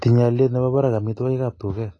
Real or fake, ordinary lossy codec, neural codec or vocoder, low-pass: real; AAC, 32 kbps; none; 10.8 kHz